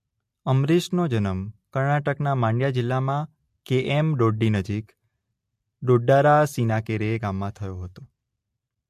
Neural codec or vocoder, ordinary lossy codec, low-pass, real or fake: none; MP3, 64 kbps; 14.4 kHz; real